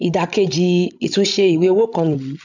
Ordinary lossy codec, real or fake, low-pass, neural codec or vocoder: none; fake; 7.2 kHz; codec, 16 kHz, 16 kbps, FreqCodec, larger model